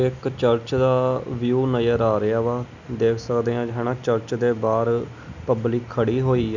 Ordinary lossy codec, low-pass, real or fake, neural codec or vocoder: none; 7.2 kHz; real; none